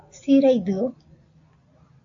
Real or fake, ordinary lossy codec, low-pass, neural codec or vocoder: real; AAC, 48 kbps; 7.2 kHz; none